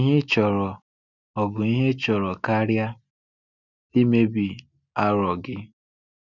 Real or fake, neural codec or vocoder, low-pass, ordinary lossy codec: real; none; 7.2 kHz; none